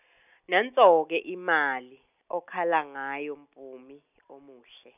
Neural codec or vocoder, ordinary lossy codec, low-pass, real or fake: none; none; 3.6 kHz; real